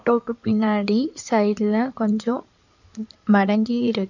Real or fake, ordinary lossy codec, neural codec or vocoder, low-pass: fake; none; codec, 16 kHz in and 24 kHz out, 2.2 kbps, FireRedTTS-2 codec; 7.2 kHz